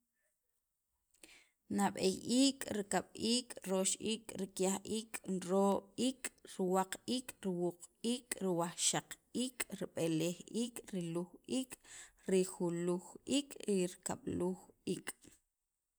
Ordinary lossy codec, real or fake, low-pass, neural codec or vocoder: none; real; none; none